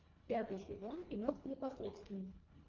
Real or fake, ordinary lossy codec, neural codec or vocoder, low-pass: fake; Opus, 32 kbps; codec, 24 kHz, 1.5 kbps, HILCodec; 7.2 kHz